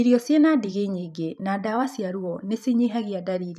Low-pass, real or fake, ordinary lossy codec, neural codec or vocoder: 14.4 kHz; fake; none; vocoder, 44.1 kHz, 128 mel bands every 512 samples, BigVGAN v2